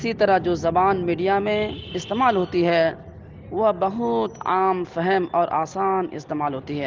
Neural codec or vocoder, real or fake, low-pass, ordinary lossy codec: none; real; 7.2 kHz; Opus, 16 kbps